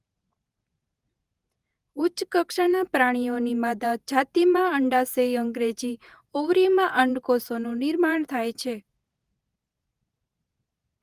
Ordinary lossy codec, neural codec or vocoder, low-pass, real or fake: Opus, 32 kbps; vocoder, 48 kHz, 128 mel bands, Vocos; 14.4 kHz; fake